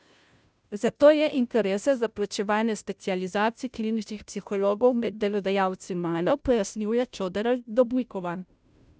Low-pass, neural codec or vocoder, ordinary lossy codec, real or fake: none; codec, 16 kHz, 0.5 kbps, FunCodec, trained on Chinese and English, 25 frames a second; none; fake